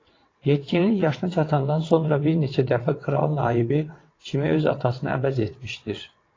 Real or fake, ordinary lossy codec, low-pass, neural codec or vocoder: fake; AAC, 32 kbps; 7.2 kHz; vocoder, 22.05 kHz, 80 mel bands, WaveNeXt